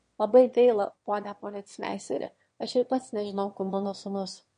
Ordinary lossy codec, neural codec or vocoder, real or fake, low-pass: MP3, 48 kbps; autoencoder, 22.05 kHz, a latent of 192 numbers a frame, VITS, trained on one speaker; fake; 9.9 kHz